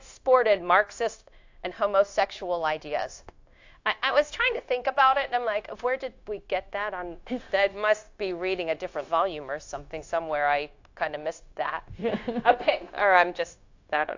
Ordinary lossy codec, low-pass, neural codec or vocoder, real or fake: AAC, 48 kbps; 7.2 kHz; codec, 16 kHz, 0.9 kbps, LongCat-Audio-Codec; fake